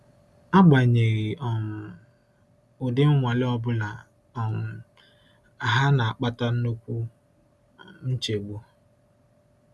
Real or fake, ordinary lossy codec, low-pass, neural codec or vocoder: real; none; none; none